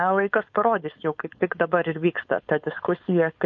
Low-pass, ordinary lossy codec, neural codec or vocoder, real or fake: 7.2 kHz; MP3, 48 kbps; codec, 16 kHz, 4.8 kbps, FACodec; fake